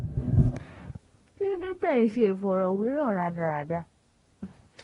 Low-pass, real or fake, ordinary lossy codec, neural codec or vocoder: 10.8 kHz; fake; AAC, 32 kbps; codec, 24 kHz, 1 kbps, SNAC